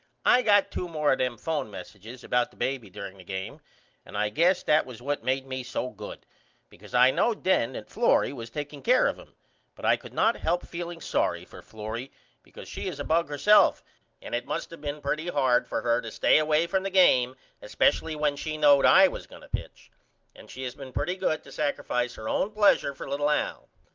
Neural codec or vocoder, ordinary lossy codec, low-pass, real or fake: none; Opus, 24 kbps; 7.2 kHz; real